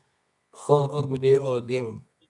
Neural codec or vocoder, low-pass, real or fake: codec, 24 kHz, 0.9 kbps, WavTokenizer, medium music audio release; 10.8 kHz; fake